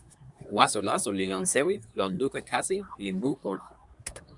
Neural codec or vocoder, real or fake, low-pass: codec, 24 kHz, 1 kbps, SNAC; fake; 10.8 kHz